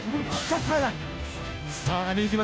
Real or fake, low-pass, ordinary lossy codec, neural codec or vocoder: fake; none; none; codec, 16 kHz, 0.5 kbps, FunCodec, trained on Chinese and English, 25 frames a second